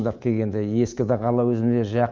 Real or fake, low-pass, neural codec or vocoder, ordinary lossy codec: fake; 7.2 kHz; codec, 24 kHz, 3.1 kbps, DualCodec; Opus, 24 kbps